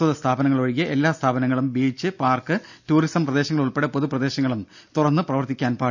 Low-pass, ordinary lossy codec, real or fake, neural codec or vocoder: 7.2 kHz; none; real; none